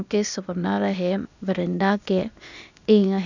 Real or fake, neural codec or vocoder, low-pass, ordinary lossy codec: fake; codec, 16 kHz, 0.8 kbps, ZipCodec; 7.2 kHz; none